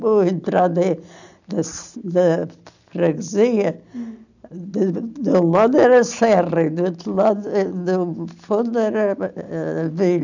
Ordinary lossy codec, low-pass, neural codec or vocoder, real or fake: none; 7.2 kHz; none; real